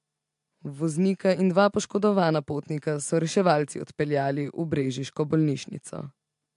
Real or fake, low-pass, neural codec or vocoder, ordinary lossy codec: real; 10.8 kHz; none; MP3, 64 kbps